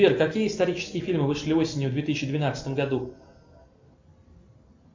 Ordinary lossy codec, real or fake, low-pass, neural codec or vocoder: MP3, 48 kbps; real; 7.2 kHz; none